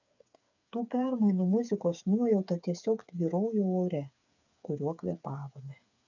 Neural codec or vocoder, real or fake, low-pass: codec, 16 kHz, 8 kbps, FreqCodec, smaller model; fake; 7.2 kHz